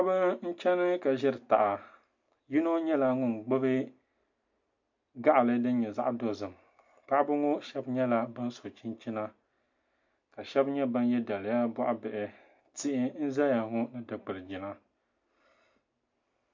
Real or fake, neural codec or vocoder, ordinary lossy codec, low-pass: real; none; MP3, 48 kbps; 7.2 kHz